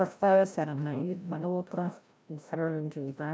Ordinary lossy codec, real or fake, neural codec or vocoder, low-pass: none; fake; codec, 16 kHz, 0.5 kbps, FreqCodec, larger model; none